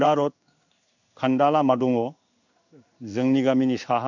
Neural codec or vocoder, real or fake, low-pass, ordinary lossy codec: codec, 16 kHz in and 24 kHz out, 1 kbps, XY-Tokenizer; fake; 7.2 kHz; none